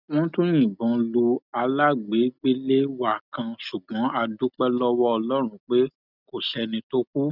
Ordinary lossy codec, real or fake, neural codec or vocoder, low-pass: none; real; none; 5.4 kHz